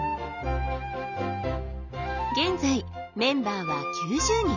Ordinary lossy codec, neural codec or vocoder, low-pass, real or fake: none; none; 7.2 kHz; real